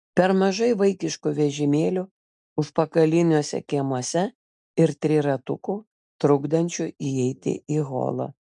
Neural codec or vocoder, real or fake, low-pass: none; real; 10.8 kHz